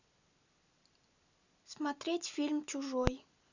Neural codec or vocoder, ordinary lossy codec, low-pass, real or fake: none; none; 7.2 kHz; real